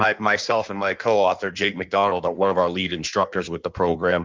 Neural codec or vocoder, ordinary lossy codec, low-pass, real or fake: codec, 16 kHz in and 24 kHz out, 1.1 kbps, FireRedTTS-2 codec; Opus, 32 kbps; 7.2 kHz; fake